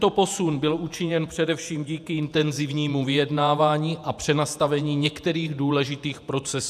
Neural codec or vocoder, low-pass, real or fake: vocoder, 48 kHz, 128 mel bands, Vocos; 14.4 kHz; fake